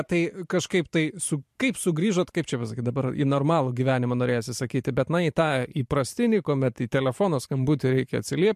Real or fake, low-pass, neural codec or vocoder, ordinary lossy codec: fake; 14.4 kHz; vocoder, 44.1 kHz, 128 mel bands every 512 samples, BigVGAN v2; MP3, 64 kbps